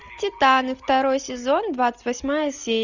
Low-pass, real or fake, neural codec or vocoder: 7.2 kHz; real; none